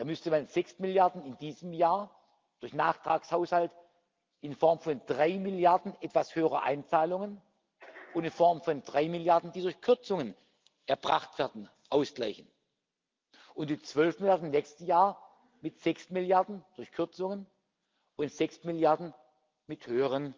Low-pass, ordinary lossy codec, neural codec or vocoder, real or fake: 7.2 kHz; Opus, 24 kbps; none; real